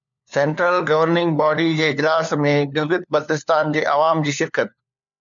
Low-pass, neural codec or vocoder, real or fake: 7.2 kHz; codec, 16 kHz, 4 kbps, FunCodec, trained on LibriTTS, 50 frames a second; fake